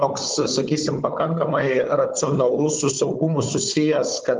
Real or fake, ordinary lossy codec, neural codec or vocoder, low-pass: fake; Opus, 24 kbps; codec, 16 kHz, 4 kbps, FunCodec, trained on Chinese and English, 50 frames a second; 7.2 kHz